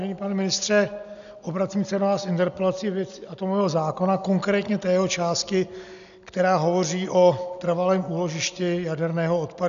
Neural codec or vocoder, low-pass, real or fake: none; 7.2 kHz; real